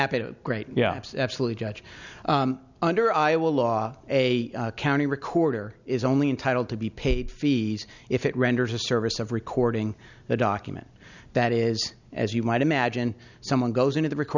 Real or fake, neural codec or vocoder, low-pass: real; none; 7.2 kHz